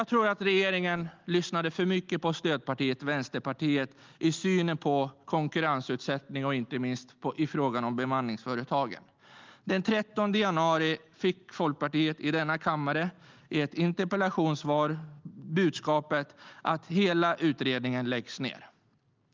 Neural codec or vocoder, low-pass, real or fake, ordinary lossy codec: none; 7.2 kHz; real; Opus, 32 kbps